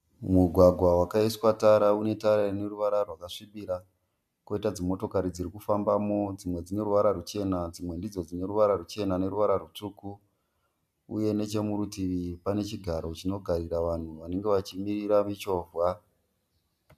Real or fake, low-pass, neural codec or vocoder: real; 14.4 kHz; none